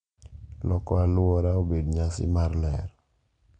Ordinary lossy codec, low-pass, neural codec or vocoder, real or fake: none; 9.9 kHz; none; real